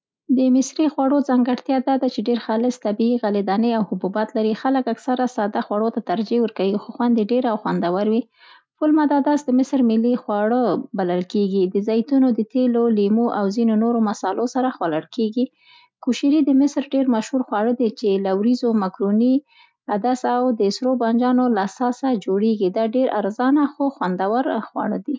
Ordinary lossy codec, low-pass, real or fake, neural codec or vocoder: none; none; real; none